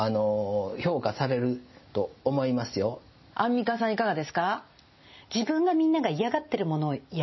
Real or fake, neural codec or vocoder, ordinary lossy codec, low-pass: real; none; MP3, 24 kbps; 7.2 kHz